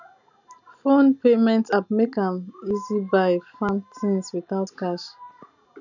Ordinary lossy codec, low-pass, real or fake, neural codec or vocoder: none; 7.2 kHz; real; none